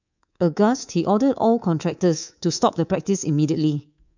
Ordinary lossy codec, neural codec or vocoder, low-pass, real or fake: none; codec, 24 kHz, 3.1 kbps, DualCodec; 7.2 kHz; fake